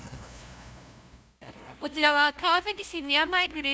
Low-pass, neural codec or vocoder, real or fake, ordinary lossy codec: none; codec, 16 kHz, 0.5 kbps, FunCodec, trained on LibriTTS, 25 frames a second; fake; none